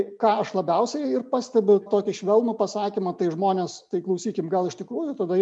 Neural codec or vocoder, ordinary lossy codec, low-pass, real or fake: none; MP3, 96 kbps; 10.8 kHz; real